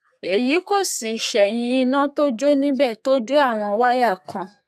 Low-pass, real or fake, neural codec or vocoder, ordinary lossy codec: 14.4 kHz; fake; codec, 32 kHz, 1.9 kbps, SNAC; none